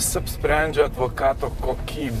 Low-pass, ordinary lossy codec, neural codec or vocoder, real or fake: 14.4 kHz; MP3, 64 kbps; vocoder, 44.1 kHz, 128 mel bands, Pupu-Vocoder; fake